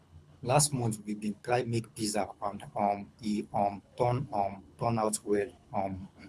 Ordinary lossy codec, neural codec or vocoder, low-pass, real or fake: none; codec, 24 kHz, 6 kbps, HILCodec; none; fake